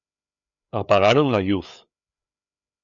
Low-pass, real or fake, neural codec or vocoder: 7.2 kHz; fake; codec, 16 kHz, 4 kbps, FreqCodec, larger model